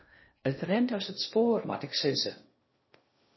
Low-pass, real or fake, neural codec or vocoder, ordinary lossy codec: 7.2 kHz; fake; codec, 16 kHz in and 24 kHz out, 0.6 kbps, FocalCodec, streaming, 2048 codes; MP3, 24 kbps